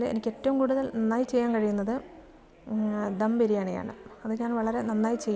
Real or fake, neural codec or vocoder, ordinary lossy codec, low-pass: real; none; none; none